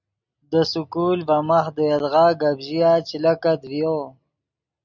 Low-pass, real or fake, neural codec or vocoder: 7.2 kHz; real; none